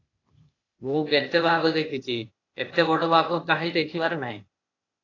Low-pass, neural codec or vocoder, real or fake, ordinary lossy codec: 7.2 kHz; codec, 16 kHz, 0.8 kbps, ZipCodec; fake; AAC, 32 kbps